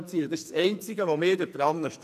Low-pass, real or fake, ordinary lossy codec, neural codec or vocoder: 14.4 kHz; fake; none; codec, 44.1 kHz, 2.6 kbps, SNAC